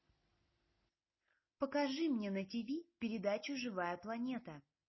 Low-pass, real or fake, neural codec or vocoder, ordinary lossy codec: 7.2 kHz; real; none; MP3, 24 kbps